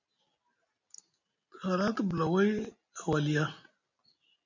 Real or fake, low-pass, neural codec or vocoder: real; 7.2 kHz; none